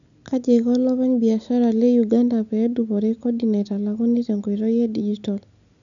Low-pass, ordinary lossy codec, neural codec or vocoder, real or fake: 7.2 kHz; none; none; real